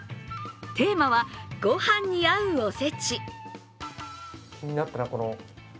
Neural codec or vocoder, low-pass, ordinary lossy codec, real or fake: none; none; none; real